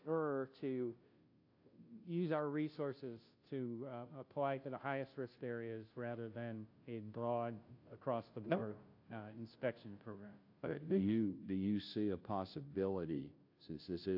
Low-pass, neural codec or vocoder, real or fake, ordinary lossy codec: 5.4 kHz; codec, 16 kHz, 0.5 kbps, FunCodec, trained on Chinese and English, 25 frames a second; fake; AAC, 48 kbps